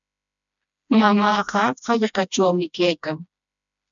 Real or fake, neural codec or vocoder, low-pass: fake; codec, 16 kHz, 1 kbps, FreqCodec, smaller model; 7.2 kHz